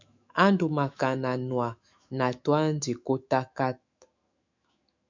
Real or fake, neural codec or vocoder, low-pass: fake; autoencoder, 48 kHz, 128 numbers a frame, DAC-VAE, trained on Japanese speech; 7.2 kHz